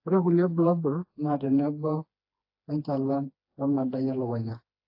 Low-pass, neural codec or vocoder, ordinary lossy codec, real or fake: 5.4 kHz; codec, 16 kHz, 2 kbps, FreqCodec, smaller model; AAC, 32 kbps; fake